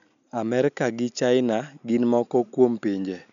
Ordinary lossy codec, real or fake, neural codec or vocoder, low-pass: none; real; none; 7.2 kHz